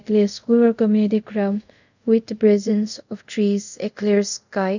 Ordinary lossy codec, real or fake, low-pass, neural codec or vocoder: none; fake; 7.2 kHz; codec, 24 kHz, 0.5 kbps, DualCodec